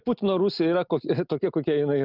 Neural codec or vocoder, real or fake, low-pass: none; real; 5.4 kHz